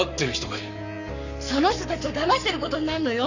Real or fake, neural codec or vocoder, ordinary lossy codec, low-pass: fake; codec, 44.1 kHz, 7.8 kbps, Pupu-Codec; none; 7.2 kHz